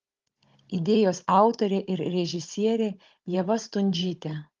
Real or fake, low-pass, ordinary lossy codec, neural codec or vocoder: fake; 7.2 kHz; Opus, 32 kbps; codec, 16 kHz, 16 kbps, FunCodec, trained on Chinese and English, 50 frames a second